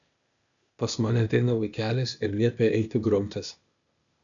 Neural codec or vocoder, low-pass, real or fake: codec, 16 kHz, 0.8 kbps, ZipCodec; 7.2 kHz; fake